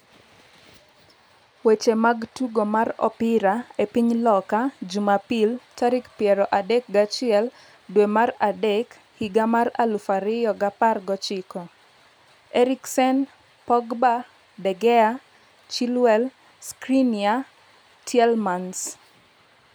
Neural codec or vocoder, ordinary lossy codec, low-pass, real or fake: none; none; none; real